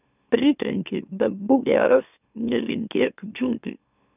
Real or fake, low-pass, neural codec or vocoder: fake; 3.6 kHz; autoencoder, 44.1 kHz, a latent of 192 numbers a frame, MeloTTS